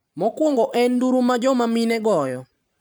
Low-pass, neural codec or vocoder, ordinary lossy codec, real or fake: none; vocoder, 44.1 kHz, 128 mel bands every 512 samples, BigVGAN v2; none; fake